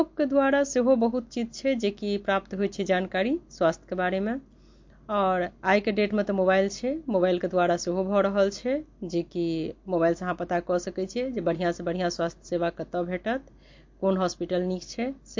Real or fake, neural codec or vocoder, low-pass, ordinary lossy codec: real; none; 7.2 kHz; MP3, 48 kbps